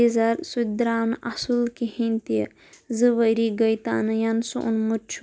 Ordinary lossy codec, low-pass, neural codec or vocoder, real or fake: none; none; none; real